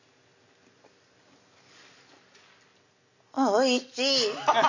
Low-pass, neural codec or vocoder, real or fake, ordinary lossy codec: 7.2 kHz; none; real; none